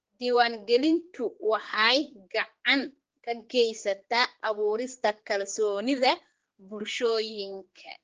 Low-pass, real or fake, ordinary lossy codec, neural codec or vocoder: 7.2 kHz; fake; Opus, 16 kbps; codec, 16 kHz, 4 kbps, X-Codec, HuBERT features, trained on general audio